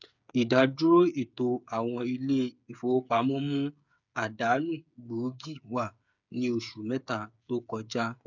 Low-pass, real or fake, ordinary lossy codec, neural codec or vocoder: 7.2 kHz; fake; none; codec, 16 kHz, 8 kbps, FreqCodec, smaller model